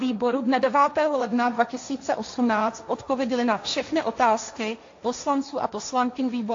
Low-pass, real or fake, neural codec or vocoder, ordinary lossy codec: 7.2 kHz; fake; codec, 16 kHz, 1.1 kbps, Voila-Tokenizer; AAC, 48 kbps